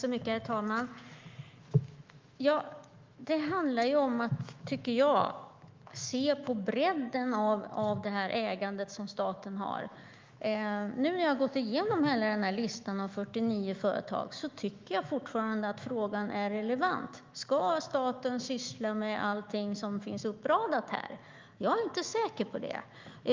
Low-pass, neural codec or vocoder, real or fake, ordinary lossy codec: 7.2 kHz; autoencoder, 48 kHz, 128 numbers a frame, DAC-VAE, trained on Japanese speech; fake; Opus, 32 kbps